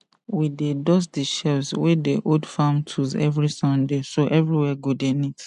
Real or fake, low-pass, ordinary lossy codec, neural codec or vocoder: real; 10.8 kHz; none; none